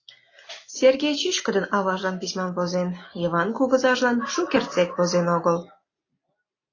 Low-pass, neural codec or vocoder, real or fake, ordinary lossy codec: 7.2 kHz; none; real; AAC, 32 kbps